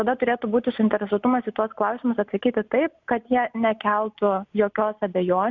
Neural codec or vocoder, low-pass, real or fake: none; 7.2 kHz; real